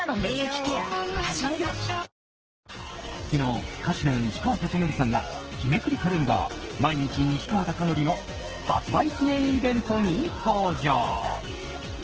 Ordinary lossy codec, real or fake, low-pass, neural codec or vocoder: Opus, 16 kbps; fake; 7.2 kHz; codec, 44.1 kHz, 2.6 kbps, SNAC